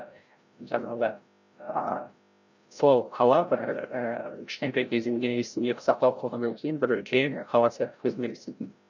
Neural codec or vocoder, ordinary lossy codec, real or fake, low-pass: codec, 16 kHz, 0.5 kbps, FreqCodec, larger model; none; fake; 7.2 kHz